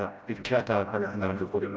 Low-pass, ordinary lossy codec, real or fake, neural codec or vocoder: none; none; fake; codec, 16 kHz, 0.5 kbps, FreqCodec, smaller model